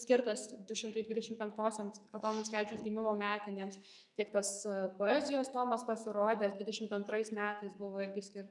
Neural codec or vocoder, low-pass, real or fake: codec, 44.1 kHz, 2.6 kbps, SNAC; 10.8 kHz; fake